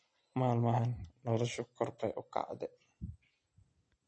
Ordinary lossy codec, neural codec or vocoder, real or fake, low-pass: MP3, 32 kbps; none; real; 9.9 kHz